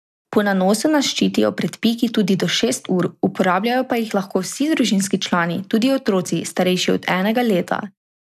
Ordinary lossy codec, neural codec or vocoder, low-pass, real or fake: none; none; 14.4 kHz; real